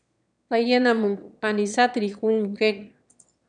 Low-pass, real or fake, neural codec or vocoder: 9.9 kHz; fake; autoencoder, 22.05 kHz, a latent of 192 numbers a frame, VITS, trained on one speaker